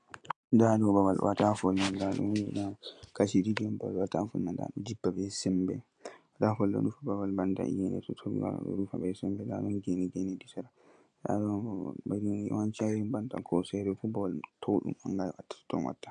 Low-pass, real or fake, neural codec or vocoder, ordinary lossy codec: 9.9 kHz; real; none; AAC, 64 kbps